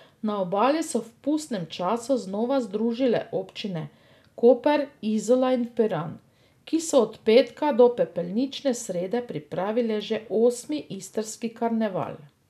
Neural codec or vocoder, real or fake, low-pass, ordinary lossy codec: none; real; 14.4 kHz; none